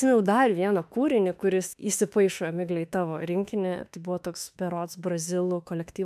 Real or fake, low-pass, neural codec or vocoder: fake; 14.4 kHz; autoencoder, 48 kHz, 32 numbers a frame, DAC-VAE, trained on Japanese speech